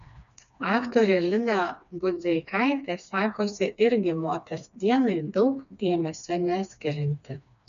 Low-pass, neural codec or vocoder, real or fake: 7.2 kHz; codec, 16 kHz, 2 kbps, FreqCodec, smaller model; fake